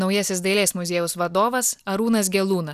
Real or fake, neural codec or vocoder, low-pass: real; none; 14.4 kHz